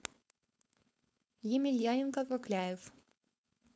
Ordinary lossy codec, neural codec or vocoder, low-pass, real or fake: none; codec, 16 kHz, 4.8 kbps, FACodec; none; fake